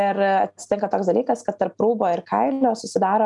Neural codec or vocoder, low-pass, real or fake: none; 10.8 kHz; real